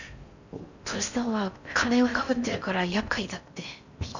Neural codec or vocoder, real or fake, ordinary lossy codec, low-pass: codec, 16 kHz in and 24 kHz out, 0.6 kbps, FocalCodec, streaming, 4096 codes; fake; none; 7.2 kHz